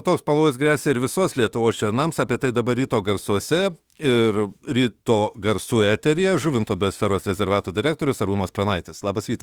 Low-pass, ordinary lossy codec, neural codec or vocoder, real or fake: 19.8 kHz; Opus, 32 kbps; autoencoder, 48 kHz, 32 numbers a frame, DAC-VAE, trained on Japanese speech; fake